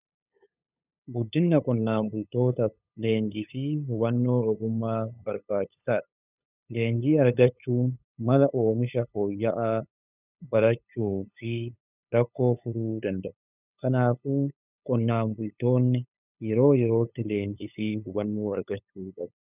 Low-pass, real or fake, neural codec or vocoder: 3.6 kHz; fake; codec, 16 kHz, 8 kbps, FunCodec, trained on LibriTTS, 25 frames a second